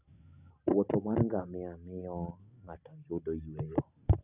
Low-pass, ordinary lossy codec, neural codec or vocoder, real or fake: 3.6 kHz; none; none; real